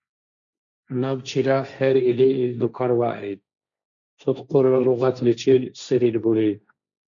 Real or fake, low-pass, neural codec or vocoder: fake; 7.2 kHz; codec, 16 kHz, 1.1 kbps, Voila-Tokenizer